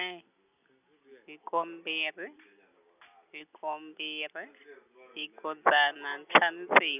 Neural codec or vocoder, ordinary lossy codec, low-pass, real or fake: none; none; 3.6 kHz; real